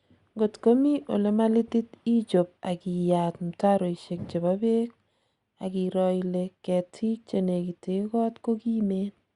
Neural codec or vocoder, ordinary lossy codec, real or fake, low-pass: none; Opus, 64 kbps; real; 10.8 kHz